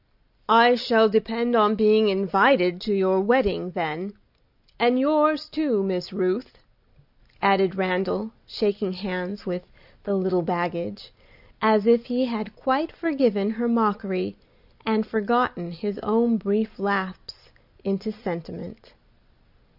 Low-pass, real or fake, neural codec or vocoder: 5.4 kHz; real; none